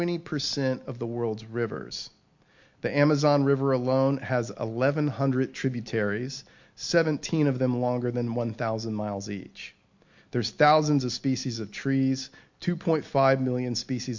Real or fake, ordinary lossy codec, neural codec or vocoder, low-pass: real; MP3, 48 kbps; none; 7.2 kHz